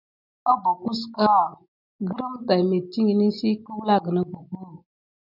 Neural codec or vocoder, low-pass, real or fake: none; 5.4 kHz; real